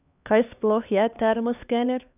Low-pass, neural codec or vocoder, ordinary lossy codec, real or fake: 3.6 kHz; codec, 16 kHz, 2 kbps, X-Codec, HuBERT features, trained on LibriSpeech; none; fake